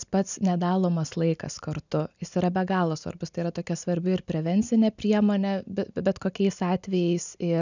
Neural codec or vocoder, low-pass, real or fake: none; 7.2 kHz; real